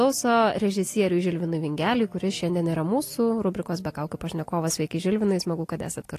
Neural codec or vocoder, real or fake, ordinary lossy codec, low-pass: none; real; AAC, 48 kbps; 14.4 kHz